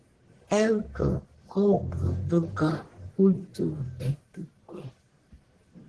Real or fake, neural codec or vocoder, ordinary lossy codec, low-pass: fake; codec, 44.1 kHz, 1.7 kbps, Pupu-Codec; Opus, 16 kbps; 10.8 kHz